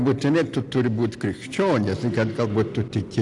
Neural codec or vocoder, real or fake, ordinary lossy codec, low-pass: none; real; AAC, 64 kbps; 10.8 kHz